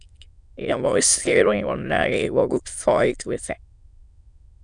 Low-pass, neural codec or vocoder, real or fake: 9.9 kHz; autoencoder, 22.05 kHz, a latent of 192 numbers a frame, VITS, trained on many speakers; fake